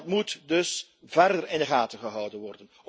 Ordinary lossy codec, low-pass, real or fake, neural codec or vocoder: none; none; real; none